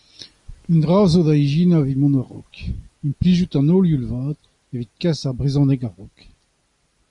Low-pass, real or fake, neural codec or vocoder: 10.8 kHz; real; none